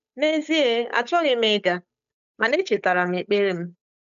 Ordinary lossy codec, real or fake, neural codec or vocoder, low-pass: AAC, 96 kbps; fake; codec, 16 kHz, 8 kbps, FunCodec, trained on Chinese and English, 25 frames a second; 7.2 kHz